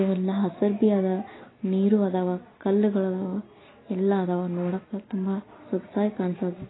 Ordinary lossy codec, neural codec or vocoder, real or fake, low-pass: AAC, 16 kbps; none; real; 7.2 kHz